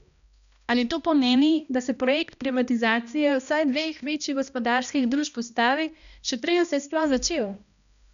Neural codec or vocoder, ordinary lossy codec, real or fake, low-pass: codec, 16 kHz, 1 kbps, X-Codec, HuBERT features, trained on balanced general audio; none; fake; 7.2 kHz